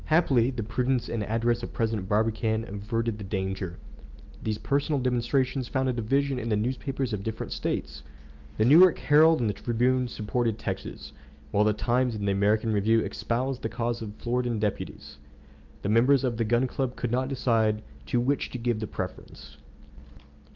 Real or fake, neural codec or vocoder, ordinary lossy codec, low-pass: real; none; Opus, 24 kbps; 7.2 kHz